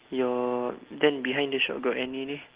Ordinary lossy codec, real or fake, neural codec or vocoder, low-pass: Opus, 16 kbps; real; none; 3.6 kHz